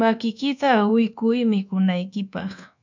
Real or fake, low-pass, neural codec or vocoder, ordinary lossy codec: fake; 7.2 kHz; codec, 24 kHz, 1.2 kbps, DualCodec; MP3, 64 kbps